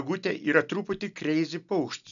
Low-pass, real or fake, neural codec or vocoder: 7.2 kHz; real; none